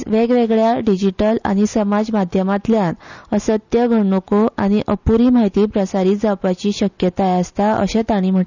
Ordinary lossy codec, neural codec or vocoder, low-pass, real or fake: none; none; 7.2 kHz; real